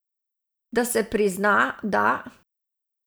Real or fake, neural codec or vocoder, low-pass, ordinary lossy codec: fake; vocoder, 44.1 kHz, 128 mel bands every 512 samples, BigVGAN v2; none; none